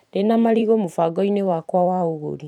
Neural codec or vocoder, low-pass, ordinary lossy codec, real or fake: vocoder, 44.1 kHz, 128 mel bands every 512 samples, BigVGAN v2; 19.8 kHz; none; fake